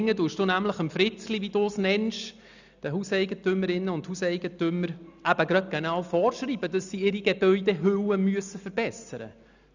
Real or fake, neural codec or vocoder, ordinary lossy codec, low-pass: real; none; none; 7.2 kHz